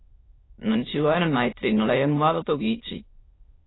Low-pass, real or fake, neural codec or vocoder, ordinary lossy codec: 7.2 kHz; fake; autoencoder, 22.05 kHz, a latent of 192 numbers a frame, VITS, trained on many speakers; AAC, 16 kbps